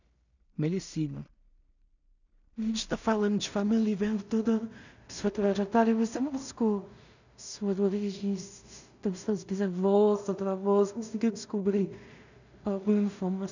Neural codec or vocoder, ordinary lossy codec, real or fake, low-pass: codec, 16 kHz in and 24 kHz out, 0.4 kbps, LongCat-Audio-Codec, two codebook decoder; none; fake; 7.2 kHz